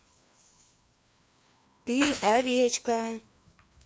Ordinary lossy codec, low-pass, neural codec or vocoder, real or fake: none; none; codec, 16 kHz, 2 kbps, FreqCodec, larger model; fake